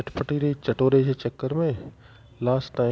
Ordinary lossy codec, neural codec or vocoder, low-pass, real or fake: none; none; none; real